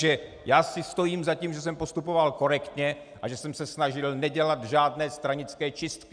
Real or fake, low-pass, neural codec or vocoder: real; 9.9 kHz; none